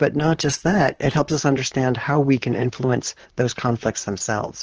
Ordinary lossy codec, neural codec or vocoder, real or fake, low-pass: Opus, 16 kbps; vocoder, 44.1 kHz, 128 mel bands, Pupu-Vocoder; fake; 7.2 kHz